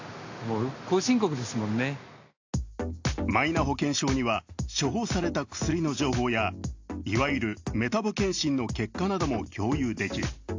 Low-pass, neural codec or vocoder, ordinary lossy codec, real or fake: 7.2 kHz; none; AAC, 48 kbps; real